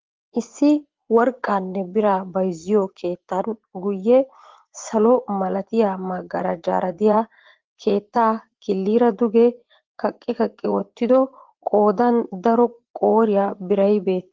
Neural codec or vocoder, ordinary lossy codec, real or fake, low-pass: vocoder, 24 kHz, 100 mel bands, Vocos; Opus, 16 kbps; fake; 7.2 kHz